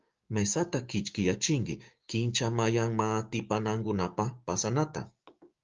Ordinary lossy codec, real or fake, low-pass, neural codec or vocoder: Opus, 32 kbps; fake; 7.2 kHz; codec, 16 kHz, 6 kbps, DAC